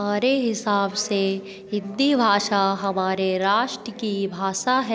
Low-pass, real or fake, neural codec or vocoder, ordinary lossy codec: none; real; none; none